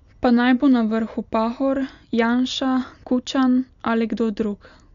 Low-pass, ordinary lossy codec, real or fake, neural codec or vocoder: 7.2 kHz; none; real; none